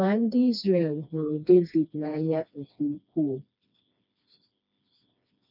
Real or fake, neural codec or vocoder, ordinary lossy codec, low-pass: fake; codec, 16 kHz, 2 kbps, FreqCodec, smaller model; none; 5.4 kHz